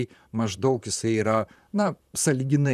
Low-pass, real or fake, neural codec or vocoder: 14.4 kHz; fake; vocoder, 44.1 kHz, 128 mel bands, Pupu-Vocoder